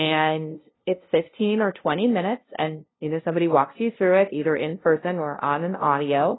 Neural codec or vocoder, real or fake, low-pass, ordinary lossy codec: codec, 16 kHz, 0.5 kbps, FunCodec, trained on LibriTTS, 25 frames a second; fake; 7.2 kHz; AAC, 16 kbps